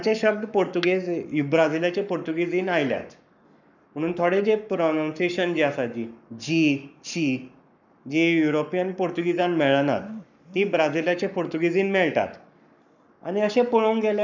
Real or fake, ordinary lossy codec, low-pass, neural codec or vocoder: fake; none; 7.2 kHz; codec, 44.1 kHz, 7.8 kbps, Pupu-Codec